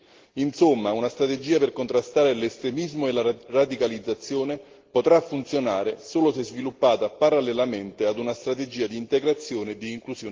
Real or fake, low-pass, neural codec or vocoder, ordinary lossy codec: real; 7.2 kHz; none; Opus, 16 kbps